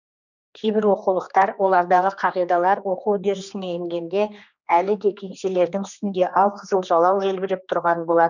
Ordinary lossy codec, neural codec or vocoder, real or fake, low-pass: none; codec, 16 kHz, 2 kbps, X-Codec, HuBERT features, trained on general audio; fake; 7.2 kHz